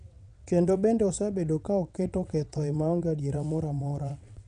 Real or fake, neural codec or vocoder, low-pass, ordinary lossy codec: fake; vocoder, 22.05 kHz, 80 mel bands, WaveNeXt; 9.9 kHz; none